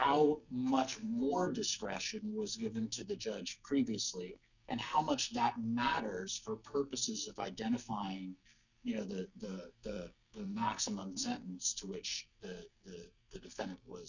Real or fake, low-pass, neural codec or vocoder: fake; 7.2 kHz; codec, 44.1 kHz, 2.6 kbps, SNAC